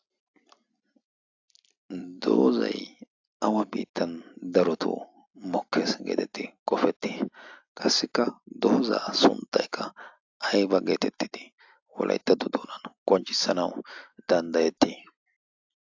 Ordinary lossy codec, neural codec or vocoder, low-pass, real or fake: AAC, 48 kbps; none; 7.2 kHz; real